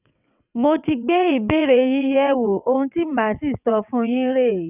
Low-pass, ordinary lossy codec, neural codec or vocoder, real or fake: 3.6 kHz; none; vocoder, 22.05 kHz, 80 mel bands, WaveNeXt; fake